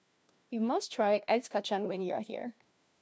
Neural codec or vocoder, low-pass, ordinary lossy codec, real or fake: codec, 16 kHz, 1 kbps, FunCodec, trained on LibriTTS, 50 frames a second; none; none; fake